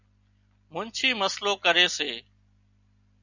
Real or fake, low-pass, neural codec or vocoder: real; 7.2 kHz; none